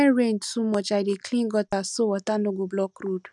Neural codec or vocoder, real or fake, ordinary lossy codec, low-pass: none; real; none; 10.8 kHz